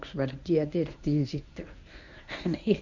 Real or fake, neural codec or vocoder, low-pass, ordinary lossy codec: fake; codec, 24 kHz, 0.9 kbps, WavTokenizer, medium speech release version 1; 7.2 kHz; none